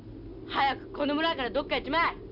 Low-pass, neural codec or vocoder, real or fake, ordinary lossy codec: 5.4 kHz; none; real; none